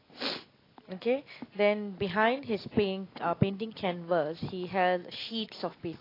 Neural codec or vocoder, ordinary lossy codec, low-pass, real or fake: none; AAC, 24 kbps; 5.4 kHz; real